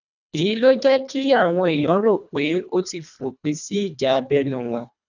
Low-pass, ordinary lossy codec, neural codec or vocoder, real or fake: 7.2 kHz; none; codec, 24 kHz, 1.5 kbps, HILCodec; fake